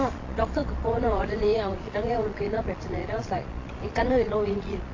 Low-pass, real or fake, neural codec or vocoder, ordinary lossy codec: 7.2 kHz; fake; vocoder, 22.05 kHz, 80 mel bands, Vocos; AAC, 32 kbps